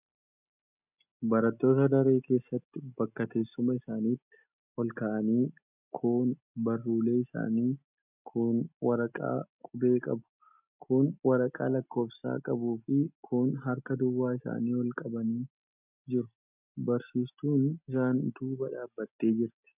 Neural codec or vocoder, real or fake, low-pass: none; real; 3.6 kHz